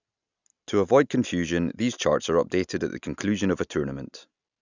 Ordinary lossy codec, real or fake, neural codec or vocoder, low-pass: none; real; none; 7.2 kHz